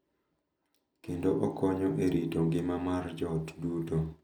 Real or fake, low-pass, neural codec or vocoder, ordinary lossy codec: real; 19.8 kHz; none; none